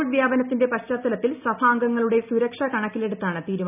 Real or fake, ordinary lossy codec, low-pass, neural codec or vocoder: real; none; 3.6 kHz; none